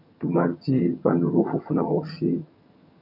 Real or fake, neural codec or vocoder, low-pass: fake; vocoder, 22.05 kHz, 80 mel bands, HiFi-GAN; 5.4 kHz